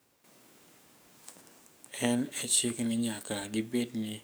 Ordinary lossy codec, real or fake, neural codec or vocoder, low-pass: none; fake; codec, 44.1 kHz, 7.8 kbps, DAC; none